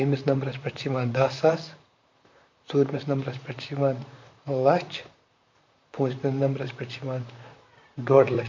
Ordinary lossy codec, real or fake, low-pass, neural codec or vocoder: MP3, 48 kbps; fake; 7.2 kHz; vocoder, 44.1 kHz, 128 mel bands, Pupu-Vocoder